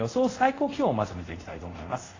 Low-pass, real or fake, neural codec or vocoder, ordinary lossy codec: 7.2 kHz; fake; codec, 24 kHz, 0.5 kbps, DualCodec; AAC, 32 kbps